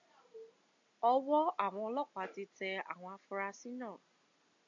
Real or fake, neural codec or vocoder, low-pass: real; none; 7.2 kHz